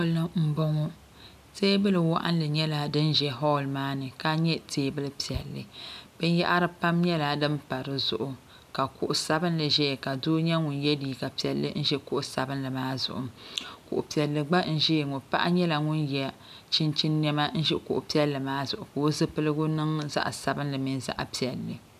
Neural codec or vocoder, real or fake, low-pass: none; real; 14.4 kHz